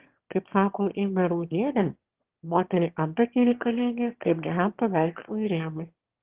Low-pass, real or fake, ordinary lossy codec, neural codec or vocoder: 3.6 kHz; fake; Opus, 16 kbps; autoencoder, 22.05 kHz, a latent of 192 numbers a frame, VITS, trained on one speaker